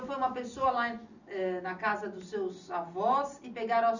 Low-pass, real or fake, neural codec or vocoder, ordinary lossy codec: 7.2 kHz; real; none; none